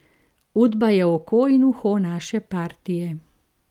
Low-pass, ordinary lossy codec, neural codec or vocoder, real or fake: 19.8 kHz; Opus, 32 kbps; none; real